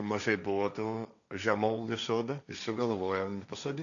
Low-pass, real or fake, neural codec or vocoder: 7.2 kHz; fake; codec, 16 kHz, 1.1 kbps, Voila-Tokenizer